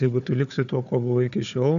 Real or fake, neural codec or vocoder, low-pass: fake; codec, 16 kHz, 4 kbps, FunCodec, trained on Chinese and English, 50 frames a second; 7.2 kHz